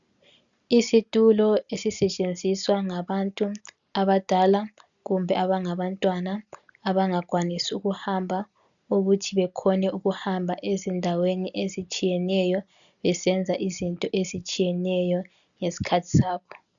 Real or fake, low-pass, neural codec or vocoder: real; 7.2 kHz; none